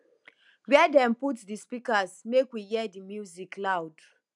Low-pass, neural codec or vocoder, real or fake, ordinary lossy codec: 10.8 kHz; none; real; none